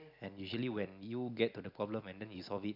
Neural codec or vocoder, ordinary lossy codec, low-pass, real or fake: none; none; 5.4 kHz; real